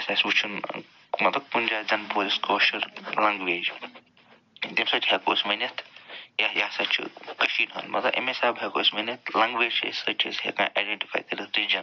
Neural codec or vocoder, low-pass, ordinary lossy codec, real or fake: none; 7.2 kHz; none; real